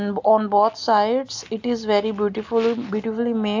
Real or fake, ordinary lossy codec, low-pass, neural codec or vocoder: real; AAC, 48 kbps; 7.2 kHz; none